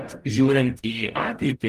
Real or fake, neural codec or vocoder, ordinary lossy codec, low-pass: fake; codec, 44.1 kHz, 0.9 kbps, DAC; Opus, 32 kbps; 14.4 kHz